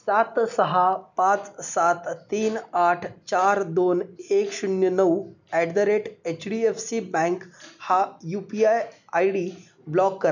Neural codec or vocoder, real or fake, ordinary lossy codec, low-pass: none; real; none; 7.2 kHz